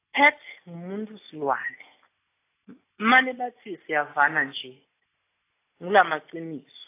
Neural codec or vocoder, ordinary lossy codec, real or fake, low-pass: none; AAC, 24 kbps; real; 3.6 kHz